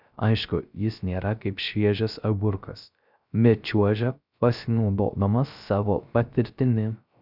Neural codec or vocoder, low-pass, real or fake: codec, 16 kHz, 0.3 kbps, FocalCodec; 5.4 kHz; fake